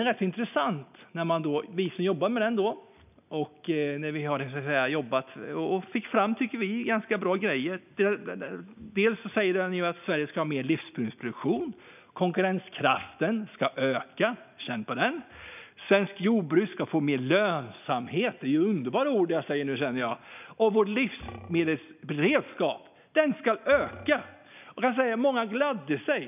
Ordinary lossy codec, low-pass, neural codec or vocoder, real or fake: none; 3.6 kHz; none; real